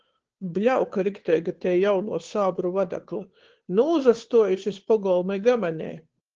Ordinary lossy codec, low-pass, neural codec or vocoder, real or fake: Opus, 16 kbps; 7.2 kHz; codec, 16 kHz, 2 kbps, FunCodec, trained on Chinese and English, 25 frames a second; fake